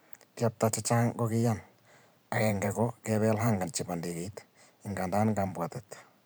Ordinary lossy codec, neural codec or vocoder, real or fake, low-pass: none; vocoder, 44.1 kHz, 128 mel bands every 512 samples, BigVGAN v2; fake; none